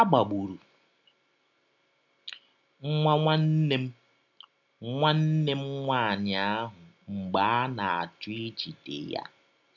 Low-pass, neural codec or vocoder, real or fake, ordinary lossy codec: 7.2 kHz; none; real; none